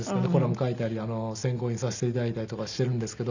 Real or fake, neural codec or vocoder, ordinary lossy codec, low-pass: real; none; none; 7.2 kHz